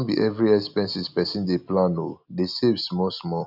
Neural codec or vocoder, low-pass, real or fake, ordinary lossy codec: none; 5.4 kHz; real; none